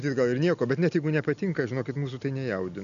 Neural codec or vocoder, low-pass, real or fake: none; 7.2 kHz; real